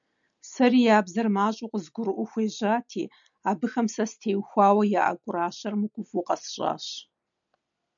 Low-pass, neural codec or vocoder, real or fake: 7.2 kHz; none; real